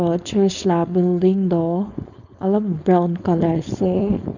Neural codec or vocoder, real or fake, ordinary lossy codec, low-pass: codec, 16 kHz, 4.8 kbps, FACodec; fake; none; 7.2 kHz